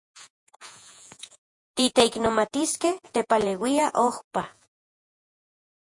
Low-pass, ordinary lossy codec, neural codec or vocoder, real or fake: 10.8 kHz; MP3, 64 kbps; vocoder, 48 kHz, 128 mel bands, Vocos; fake